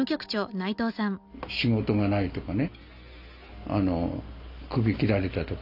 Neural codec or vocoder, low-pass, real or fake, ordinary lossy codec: none; 5.4 kHz; real; none